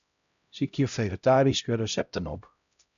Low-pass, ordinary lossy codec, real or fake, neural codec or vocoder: 7.2 kHz; MP3, 96 kbps; fake; codec, 16 kHz, 0.5 kbps, X-Codec, HuBERT features, trained on LibriSpeech